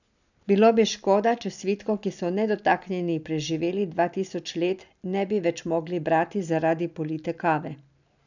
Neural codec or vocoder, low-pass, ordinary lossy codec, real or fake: none; 7.2 kHz; none; real